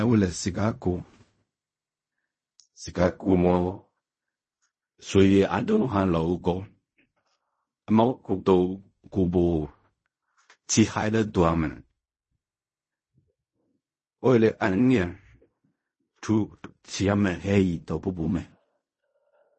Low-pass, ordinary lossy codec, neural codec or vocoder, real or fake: 10.8 kHz; MP3, 32 kbps; codec, 16 kHz in and 24 kHz out, 0.4 kbps, LongCat-Audio-Codec, fine tuned four codebook decoder; fake